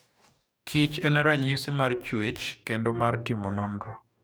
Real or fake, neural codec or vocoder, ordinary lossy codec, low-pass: fake; codec, 44.1 kHz, 2.6 kbps, DAC; none; none